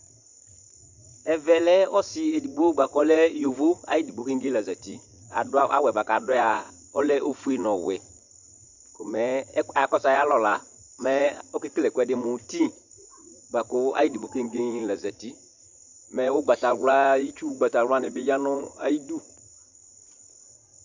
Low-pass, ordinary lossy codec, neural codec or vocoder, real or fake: 7.2 kHz; MP3, 64 kbps; vocoder, 44.1 kHz, 80 mel bands, Vocos; fake